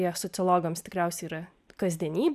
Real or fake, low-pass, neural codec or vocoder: real; 14.4 kHz; none